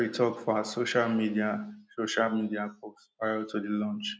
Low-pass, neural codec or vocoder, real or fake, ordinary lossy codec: none; none; real; none